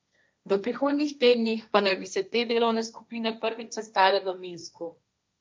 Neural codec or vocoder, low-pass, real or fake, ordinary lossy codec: codec, 16 kHz, 1.1 kbps, Voila-Tokenizer; none; fake; none